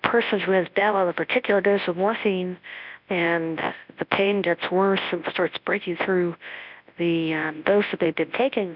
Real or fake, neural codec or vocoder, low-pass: fake; codec, 24 kHz, 0.9 kbps, WavTokenizer, large speech release; 5.4 kHz